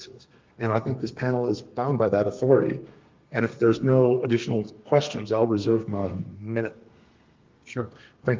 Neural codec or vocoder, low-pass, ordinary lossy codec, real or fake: codec, 32 kHz, 1.9 kbps, SNAC; 7.2 kHz; Opus, 32 kbps; fake